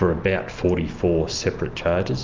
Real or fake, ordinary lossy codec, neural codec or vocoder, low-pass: real; Opus, 32 kbps; none; 7.2 kHz